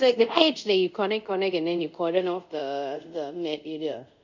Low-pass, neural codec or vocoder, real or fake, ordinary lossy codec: 7.2 kHz; codec, 24 kHz, 0.5 kbps, DualCodec; fake; none